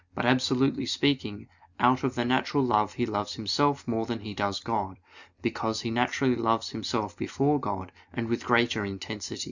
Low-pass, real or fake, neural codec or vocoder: 7.2 kHz; real; none